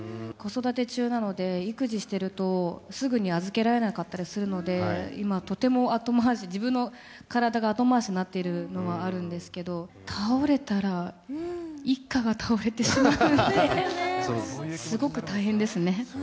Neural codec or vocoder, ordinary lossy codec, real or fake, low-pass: none; none; real; none